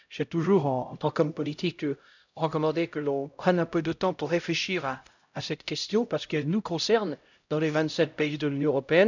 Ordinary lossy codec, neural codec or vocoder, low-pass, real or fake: none; codec, 16 kHz, 0.5 kbps, X-Codec, HuBERT features, trained on LibriSpeech; 7.2 kHz; fake